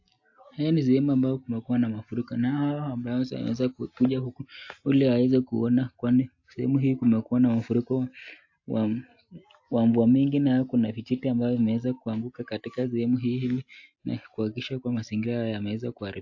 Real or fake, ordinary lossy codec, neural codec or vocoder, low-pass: real; AAC, 48 kbps; none; 7.2 kHz